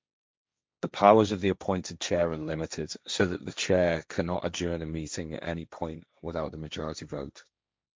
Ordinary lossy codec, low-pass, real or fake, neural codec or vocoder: none; none; fake; codec, 16 kHz, 1.1 kbps, Voila-Tokenizer